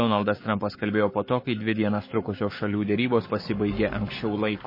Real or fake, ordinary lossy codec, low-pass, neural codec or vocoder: fake; MP3, 24 kbps; 5.4 kHz; codec, 44.1 kHz, 7.8 kbps, Pupu-Codec